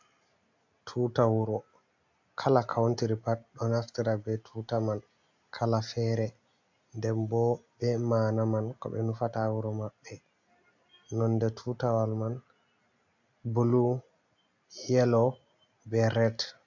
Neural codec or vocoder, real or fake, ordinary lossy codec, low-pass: none; real; AAC, 48 kbps; 7.2 kHz